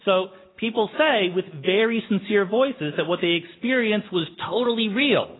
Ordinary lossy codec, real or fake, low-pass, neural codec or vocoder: AAC, 16 kbps; real; 7.2 kHz; none